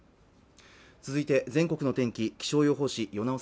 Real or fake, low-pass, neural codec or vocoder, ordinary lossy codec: real; none; none; none